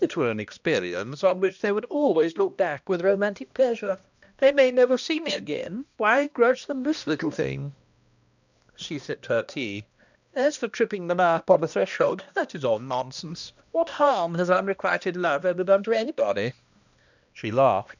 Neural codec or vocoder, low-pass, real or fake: codec, 16 kHz, 1 kbps, X-Codec, HuBERT features, trained on balanced general audio; 7.2 kHz; fake